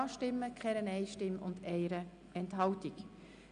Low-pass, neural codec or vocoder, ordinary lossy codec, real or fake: 9.9 kHz; none; none; real